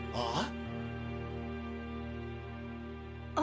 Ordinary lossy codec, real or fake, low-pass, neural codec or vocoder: none; real; none; none